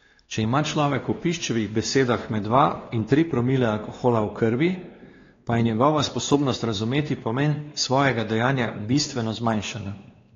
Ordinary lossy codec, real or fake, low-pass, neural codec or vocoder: AAC, 32 kbps; fake; 7.2 kHz; codec, 16 kHz, 2 kbps, X-Codec, WavLM features, trained on Multilingual LibriSpeech